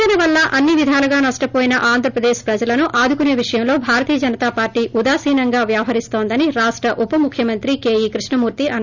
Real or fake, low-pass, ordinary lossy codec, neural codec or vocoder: real; 7.2 kHz; none; none